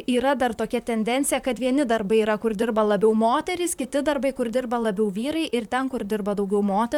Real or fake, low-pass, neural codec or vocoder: fake; 19.8 kHz; vocoder, 44.1 kHz, 128 mel bands, Pupu-Vocoder